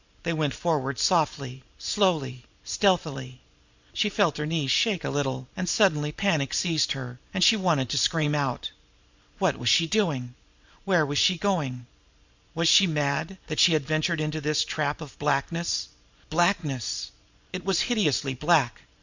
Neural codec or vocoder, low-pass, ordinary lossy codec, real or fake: vocoder, 44.1 kHz, 128 mel bands every 512 samples, BigVGAN v2; 7.2 kHz; Opus, 64 kbps; fake